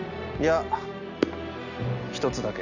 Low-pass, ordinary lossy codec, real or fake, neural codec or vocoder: 7.2 kHz; none; real; none